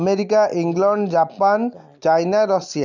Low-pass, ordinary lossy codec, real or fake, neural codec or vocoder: 7.2 kHz; none; real; none